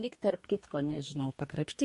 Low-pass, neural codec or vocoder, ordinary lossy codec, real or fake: 14.4 kHz; codec, 44.1 kHz, 2.6 kbps, DAC; MP3, 48 kbps; fake